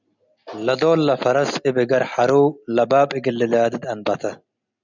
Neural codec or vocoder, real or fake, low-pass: none; real; 7.2 kHz